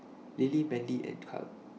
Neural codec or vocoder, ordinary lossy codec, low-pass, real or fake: none; none; none; real